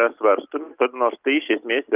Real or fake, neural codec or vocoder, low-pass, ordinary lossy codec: real; none; 3.6 kHz; Opus, 32 kbps